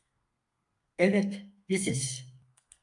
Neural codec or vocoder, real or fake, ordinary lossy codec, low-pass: codec, 32 kHz, 1.9 kbps, SNAC; fake; AAC, 64 kbps; 10.8 kHz